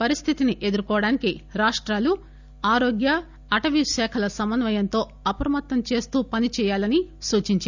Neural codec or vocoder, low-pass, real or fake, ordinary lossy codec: none; 7.2 kHz; real; none